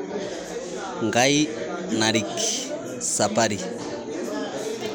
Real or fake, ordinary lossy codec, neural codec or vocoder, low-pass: real; none; none; none